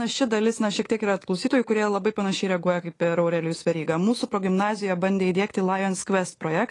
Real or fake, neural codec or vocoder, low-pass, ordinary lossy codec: fake; codec, 24 kHz, 3.1 kbps, DualCodec; 10.8 kHz; AAC, 32 kbps